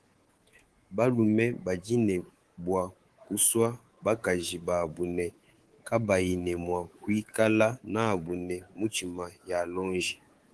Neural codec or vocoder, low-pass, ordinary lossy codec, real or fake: codec, 24 kHz, 3.1 kbps, DualCodec; 10.8 kHz; Opus, 16 kbps; fake